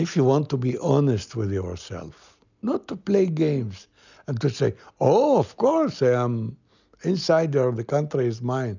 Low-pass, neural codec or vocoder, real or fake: 7.2 kHz; none; real